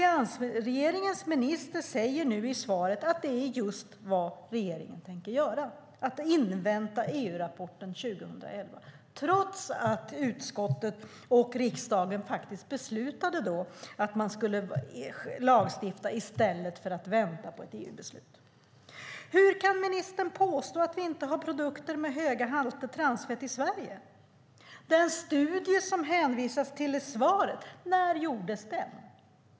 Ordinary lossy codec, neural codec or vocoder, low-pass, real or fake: none; none; none; real